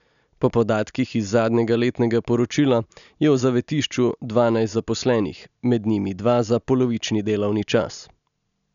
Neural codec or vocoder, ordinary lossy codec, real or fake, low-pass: none; none; real; 7.2 kHz